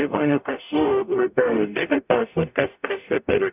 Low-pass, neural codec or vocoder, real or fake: 3.6 kHz; codec, 44.1 kHz, 0.9 kbps, DAC; fake